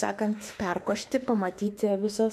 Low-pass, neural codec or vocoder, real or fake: 14.4 kHz; autoencoder, 48 kHz, 32 numbers a frame, DAC-VAE, trained on Japanese speech; fake